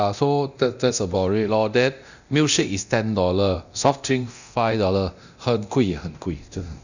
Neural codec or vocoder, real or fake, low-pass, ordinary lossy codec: codec, 24 kHz, 0.9 kbps, DualCodec; fake; 7.2 kHz; none